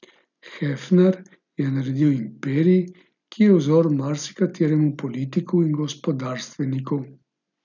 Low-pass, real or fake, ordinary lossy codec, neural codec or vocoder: 7.2 kHz; real; none; none